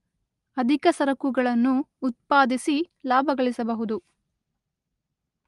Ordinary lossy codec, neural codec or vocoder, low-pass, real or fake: Opus, 32 kbps; none; 10.8 kHz; real